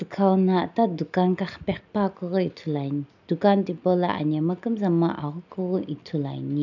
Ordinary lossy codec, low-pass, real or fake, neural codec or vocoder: none; 7.2 kHz; real; none